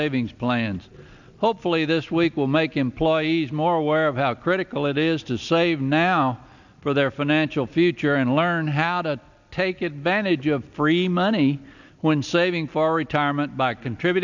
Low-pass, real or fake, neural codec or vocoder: 7.2 kHz; real; none